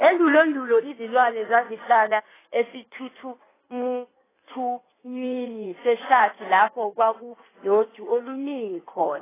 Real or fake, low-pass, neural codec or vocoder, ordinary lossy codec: fake; 3.6 kHz; codec, 16 kHz in and 24 kHz out, 1.1 kbps, FireRedTTS-2 codec; AAC, 16 kbps